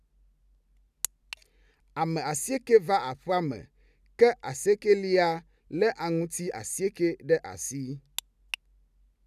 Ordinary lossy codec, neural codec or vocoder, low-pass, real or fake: none; none; 14.4 kHz; real